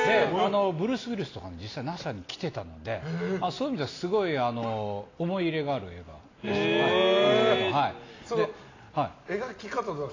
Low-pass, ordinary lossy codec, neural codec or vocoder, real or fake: 7.2 kHz; AAC, 32 kbps; none; real